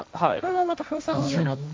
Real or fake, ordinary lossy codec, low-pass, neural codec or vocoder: fake; none; none; codec, 16 kHz, 1.1 kbps, Voila-Tokenizer